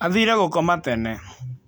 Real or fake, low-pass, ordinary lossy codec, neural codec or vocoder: real; none; none; none